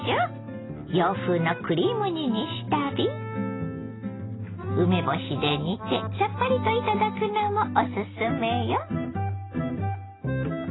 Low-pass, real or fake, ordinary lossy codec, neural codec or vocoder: 7.2 kHz; real; AAC, 16 kbps; none